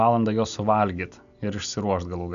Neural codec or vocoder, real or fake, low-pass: none; real; 7.2 kHz